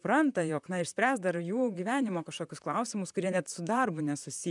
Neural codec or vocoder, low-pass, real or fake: vocoder, 44.1 kHz, 128 mel bands, Pupu-Vocoder; 10.8 kHz; fake